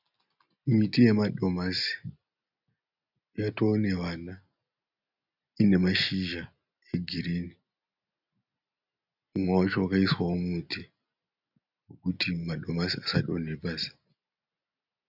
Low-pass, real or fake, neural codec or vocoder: 5.4 kHz; real; none